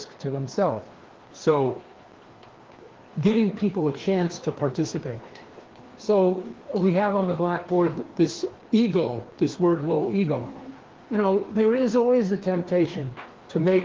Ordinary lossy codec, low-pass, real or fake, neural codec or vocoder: Opus, 16 kbps; 7.2 kHz; fake; codec, 16 kHz, 2 kbps, FreqCodec, larger model